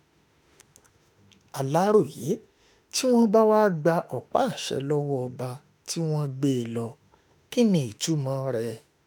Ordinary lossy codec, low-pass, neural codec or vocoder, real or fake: none; none; autoencoder, 48 kHz, 32 numbers a frame, DAC-VAE, trained on Japanese speech; fake